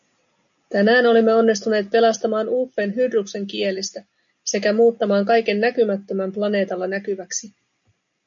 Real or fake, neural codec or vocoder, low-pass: real; none; 7.2 kHz